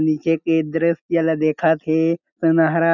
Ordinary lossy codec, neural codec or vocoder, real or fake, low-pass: none; none; real; 7.2 kHz